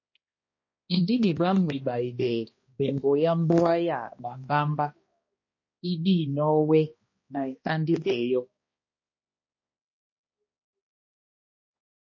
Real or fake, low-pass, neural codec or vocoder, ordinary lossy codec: fake; 7.2 kHz; codec, 16 kHz, 1 kbps, X-Codec, HuBERT features, trained on balanced general audio; MP3, 32 kbps